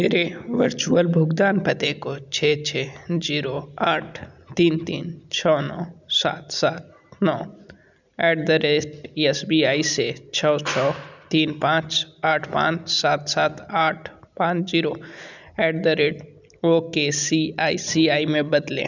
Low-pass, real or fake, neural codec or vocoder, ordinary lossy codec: 7.2 kHz; real; none; none